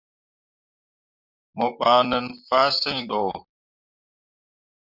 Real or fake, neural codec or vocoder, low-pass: fake; vocoder, 44.1 kHz, 128 mel bands, Pupu-Vocoder; 5.4 kHz